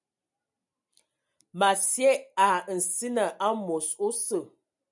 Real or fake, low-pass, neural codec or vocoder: real; 10.8 kHz; none